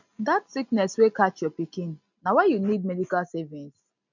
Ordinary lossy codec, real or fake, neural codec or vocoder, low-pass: none; real; none; 7.2 kHz